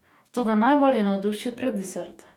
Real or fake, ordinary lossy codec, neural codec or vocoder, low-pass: fake; none; codec, 44.1 kHz, 2.6 kbps, DAC; 19.8 kHz